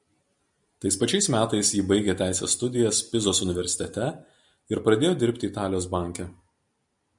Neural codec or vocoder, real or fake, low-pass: none; real; 10.8 kHz